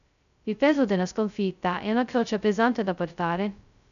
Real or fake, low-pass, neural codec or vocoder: fake; 7.2 kHz; codec, 16 kHz, 0.2 kbps, FocalCodec